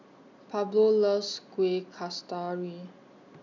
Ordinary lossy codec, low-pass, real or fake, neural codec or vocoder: none; 7.2 kHz; real; none